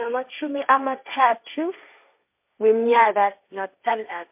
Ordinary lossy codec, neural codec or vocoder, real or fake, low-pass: MP3, 32 kbps; codec, 16 kHz, 1.1 kbps, Voila-Tokenizer; fake; 3.6 kHz